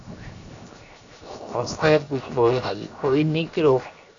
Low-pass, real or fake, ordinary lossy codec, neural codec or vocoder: 7.2 kHz; fake; AAC, 64 kbps; codec, 16 kHz, 0.7 kbps, FocalCodec